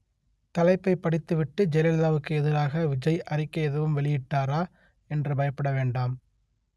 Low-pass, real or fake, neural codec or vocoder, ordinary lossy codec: none; real; none; none